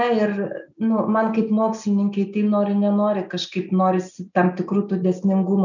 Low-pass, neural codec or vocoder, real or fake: 7.2 kHz; none; real